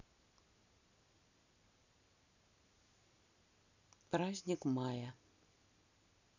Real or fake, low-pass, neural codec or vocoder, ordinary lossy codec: real; 7.2 kHz; none; none